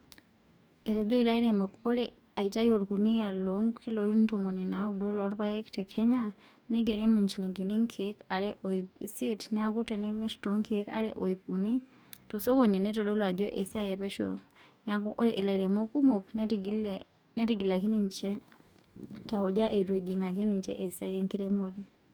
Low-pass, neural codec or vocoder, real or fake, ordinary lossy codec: none; codec, 44.1 kHz, 2.6 kbps, DAC; fake; none